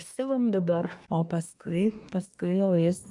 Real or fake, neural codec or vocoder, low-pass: fake; codec, 24 kHz, 1 kbps, SNAC; 10.8 kHz